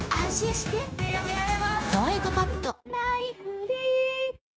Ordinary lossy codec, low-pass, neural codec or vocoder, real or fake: none; none; codec, 16 kHz, 0.9 kbps, LongCat-Audio-Codec; fake